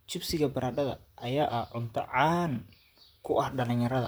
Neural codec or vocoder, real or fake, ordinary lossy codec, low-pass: vocoder, 44.1 kHz, 128 mel bands, Pupu-Vocoder; fake; none; none